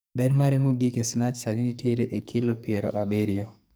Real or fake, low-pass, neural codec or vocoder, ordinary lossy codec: fake; none; codec, 44.1 kHz, 2.6 kbps, SNAC; none